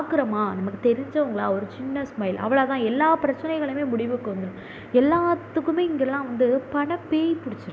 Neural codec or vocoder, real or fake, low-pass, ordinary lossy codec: none; real; none; none